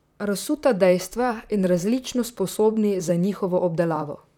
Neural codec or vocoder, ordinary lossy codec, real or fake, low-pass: vocoder, 44.1 kHz, 128 mel bands, Pupu-Vocoder; none; fake; 19.8 kHz